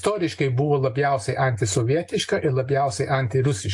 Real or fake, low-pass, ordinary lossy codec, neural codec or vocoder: real; 10.8 kHz; AAC, 64 kbps; none